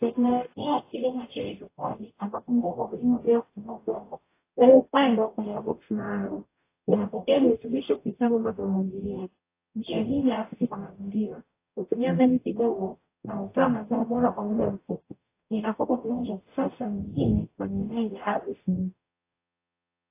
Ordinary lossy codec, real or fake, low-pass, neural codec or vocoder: AAC, 24 kbps; fake; 3.6 kHz; codec, 44.1 kHz, 0.9 kbps, DAC